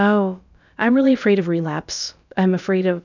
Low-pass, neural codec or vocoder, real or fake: 7.2 kHz; codec, 16 kHz, about 1 kbps, DyCAST, with the encoder's durations; fake